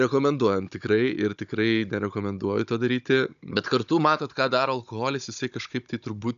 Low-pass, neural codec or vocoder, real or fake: 7.2 kHz; codec, 16 kHz, 16 kbps, FunCodec, trained on Chinese and English, 50 frames a second; fake